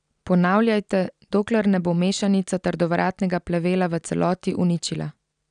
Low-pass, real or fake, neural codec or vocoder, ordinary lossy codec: 9.9 kHz; real; none; none